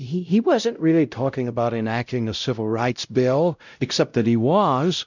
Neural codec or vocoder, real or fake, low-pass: codec, 16 kHz, 0.5 kbps, X-Codec, WavLM features, trained on Multilingual LibriSpeech; fake; 7.2 kHz